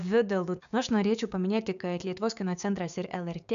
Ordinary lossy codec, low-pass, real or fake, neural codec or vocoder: AAC, 96 kbps; 7.2 kHz; fake; codec, 16 kHz, 6 kbps, DAC